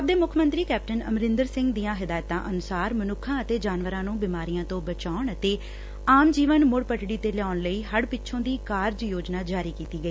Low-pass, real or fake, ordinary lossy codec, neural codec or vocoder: none; real; none; none